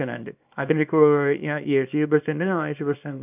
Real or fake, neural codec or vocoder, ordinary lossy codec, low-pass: fake; codec, 24 kHz, 0.9 kbps, WavTokenizer, small release; none; 3.6 kHz